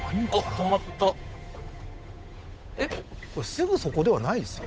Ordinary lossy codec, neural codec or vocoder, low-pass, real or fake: none; codec, 16 kHz, 8 kbps, FunCodec, trained on Chinese and English, 25 frames a second; none; fake